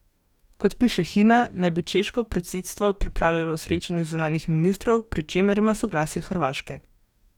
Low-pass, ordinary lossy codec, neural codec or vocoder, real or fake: 19.8 kHz; none; codec, 44.1 kHz, 2.6 kbps, DAC; fake